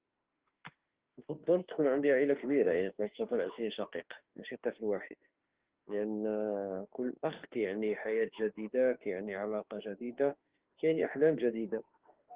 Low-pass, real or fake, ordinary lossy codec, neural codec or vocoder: 3.6 kHz; fake; Opus, 16 kbps; autoencoder, 48 kHz, 32 numbers a frame, DAC-VAE, trained on Japanese speech